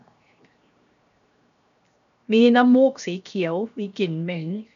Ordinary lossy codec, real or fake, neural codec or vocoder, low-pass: none; fake; codec, 16 kHz, 0.7 kbps, FocalCodec; 7.2 kHz